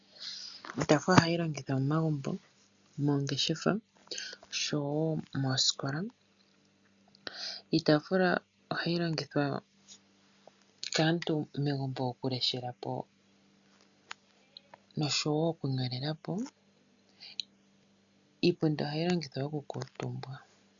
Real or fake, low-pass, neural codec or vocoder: real; 7.2 kHz; none